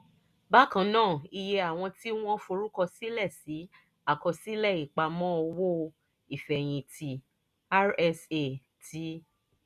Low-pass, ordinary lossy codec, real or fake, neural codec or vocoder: 14.4 kHz; none; real; none